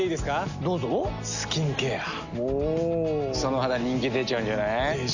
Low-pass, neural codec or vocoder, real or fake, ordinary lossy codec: 7.2 kHz; none; real; none